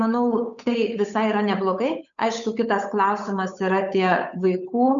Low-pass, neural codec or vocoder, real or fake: 7.2 kHz; codec, 16 kHz, 8 kbps, FunCodec, trained on Chinese and English, 25 frames a second; fake